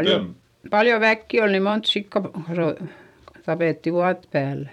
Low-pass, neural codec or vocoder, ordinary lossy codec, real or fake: 19.8 kHz; vocoder, 44.1 kHz, 128 mel bands every 256 samples, BigVGAN v2; none; fake